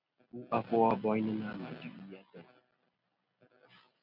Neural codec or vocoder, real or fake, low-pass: none; real; 5.4 kHz